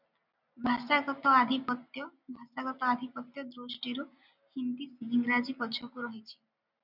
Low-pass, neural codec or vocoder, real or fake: 5.4 kHz; none; real